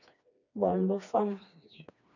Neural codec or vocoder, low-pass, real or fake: codec, 16 kHz, 2 kbps, FreqCodec, smaller model; 7.2 kHz; fake